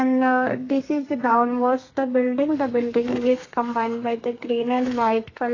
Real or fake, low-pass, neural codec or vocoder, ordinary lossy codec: fake; 7.2 kHz; codec, 32 kHz, 1.9 kbps, SNAC; AAC, 32 kbps